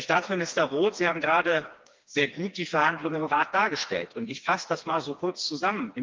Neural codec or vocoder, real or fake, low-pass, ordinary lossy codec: codec, 16 kHz, 2 kbps, FreqCodec, smaller model; fake; 7.2 kHz; Opus, 32 kbps